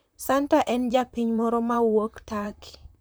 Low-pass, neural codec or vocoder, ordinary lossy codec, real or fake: none; vocoder, 44.1 kHz, 128 mel bands, Pupu-Vocoder; none; fake